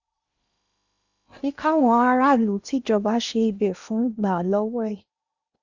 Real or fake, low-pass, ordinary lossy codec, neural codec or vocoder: fake; 7.2 kHz; Opus, 64 kbps; codec, 16 kHz in and 24 kHz out, 0.8 kbps, FocalCodec, streaming, 65536 codes